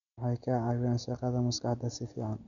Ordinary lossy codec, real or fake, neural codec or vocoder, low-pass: none; real; none; 7.2 kHz